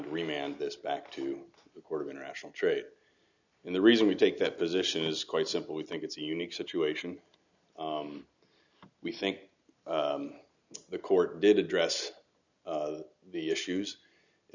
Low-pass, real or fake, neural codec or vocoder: 7.2 kHz; real; none